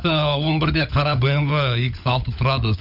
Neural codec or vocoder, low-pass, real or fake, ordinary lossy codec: codec, 16 kHz, 16 kbps, FunCodec, trained on LibriTTS, 50 frames a second; 5.4 kHz; fake; none